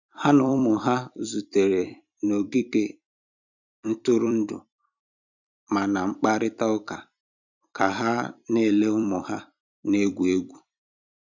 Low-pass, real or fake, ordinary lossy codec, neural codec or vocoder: 7.2 kHz; fake; none; vocoder, 44.1 kHz, 128 mel bands every 256 samples, BigVGAN v2